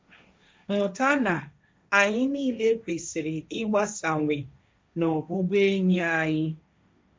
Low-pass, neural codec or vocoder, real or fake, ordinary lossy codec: none; codec, 16 kHz, 1.1 kbps, Voila-Tokenizer; fake; none